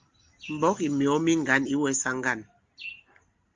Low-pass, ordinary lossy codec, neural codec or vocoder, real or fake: 7.2 kHz; Opus, 32 kbps; none; real